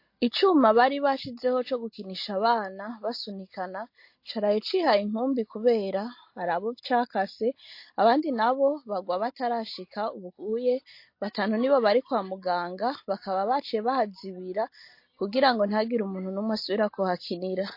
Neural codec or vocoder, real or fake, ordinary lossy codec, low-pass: none; real; MP3, 32 kbps; 5.4 kHz